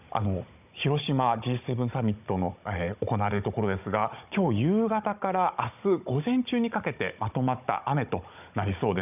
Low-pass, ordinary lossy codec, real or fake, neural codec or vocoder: 3.6 kHz; none; fake; codec, 16 kHz, 16 kbps, FunCodec, trained on Chinese and English, 50 frames a second